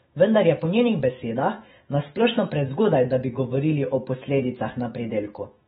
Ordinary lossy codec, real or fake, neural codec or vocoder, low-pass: AAC, 16 kbps; fake; autoencoder, 48 kHz, 128 numbers a frame, DAC-VAE, trained on Japanese speech; 19.8 kHz